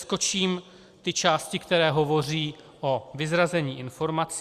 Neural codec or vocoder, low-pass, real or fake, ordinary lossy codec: none; 14.4 kHz; real; Opus, 64 kbps